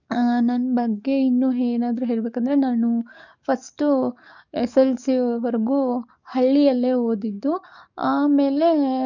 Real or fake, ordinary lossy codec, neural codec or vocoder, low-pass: fake; none; codec, 44.1 kHz, 7.8 kbps, DAC; 7.2 kHz